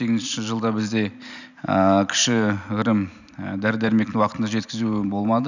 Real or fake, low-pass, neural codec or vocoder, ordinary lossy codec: real; 7.2 kHz; none; none